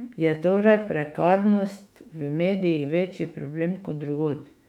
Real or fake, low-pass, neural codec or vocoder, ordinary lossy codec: fake; 19.8 kHz; autoencoder, 48 kHz, 32 numbers a frame, DAC-VAE, trained on Japanese speech; none